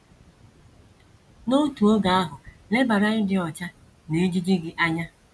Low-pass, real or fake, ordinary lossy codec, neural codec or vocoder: none; real; none; none